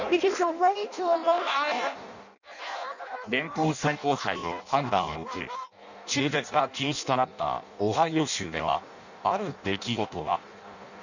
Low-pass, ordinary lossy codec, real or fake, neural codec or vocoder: 7.2 kHz; none; fake; codec, 16 kHz in and 24 kHz out, 0.6 kbps, FireRedTTS-2 codec